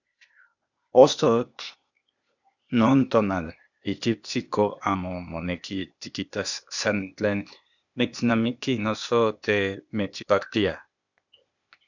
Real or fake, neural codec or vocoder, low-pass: fake; codec, 16 kHz, 0.8 kbps, ZipCodec; 7.2 kHz